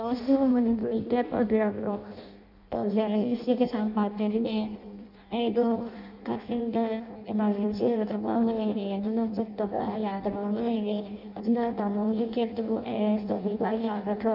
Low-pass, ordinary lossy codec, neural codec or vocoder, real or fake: 5.4 kHz; none; codec, 16 kHz in and 24 kHz out, 0.6 kbps, FireRedTTS-2 codec; fake